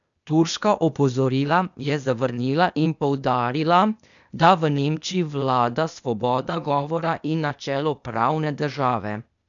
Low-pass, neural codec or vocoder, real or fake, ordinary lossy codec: 7.2 kHz; codec, 16 kHz, 0.8 kbps, ZipCodec; fake; none